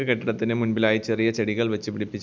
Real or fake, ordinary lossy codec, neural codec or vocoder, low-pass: real; none; none; none